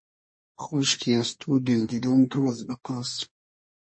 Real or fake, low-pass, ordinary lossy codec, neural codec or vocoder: fake; 9.9 kHz; MP3, 32 kbps; codec, 24 kHz, 1 kbps, SNAC